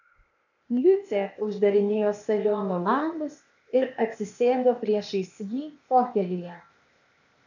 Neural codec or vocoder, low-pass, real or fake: codec, 16 kHz, 0.8 kbps, ZipCodec; 7.2 kHz; fake